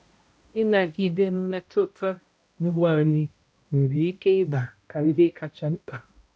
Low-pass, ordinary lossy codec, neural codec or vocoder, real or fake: none; none; codec, 16 kHz, 0.5 kbps, X-Codec, HuBERT features, trained on balanced general audio; fake